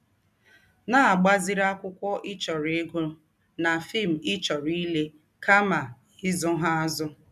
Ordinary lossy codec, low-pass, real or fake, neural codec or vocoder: none; 14.4 kHz; real; none